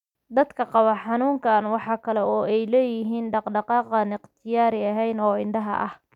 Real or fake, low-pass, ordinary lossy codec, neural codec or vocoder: real; 19.8 kHz; none; none